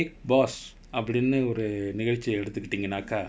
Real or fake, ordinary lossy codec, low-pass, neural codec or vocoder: real; none; none; none